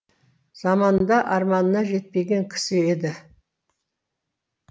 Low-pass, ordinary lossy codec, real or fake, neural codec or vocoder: none; none; real; none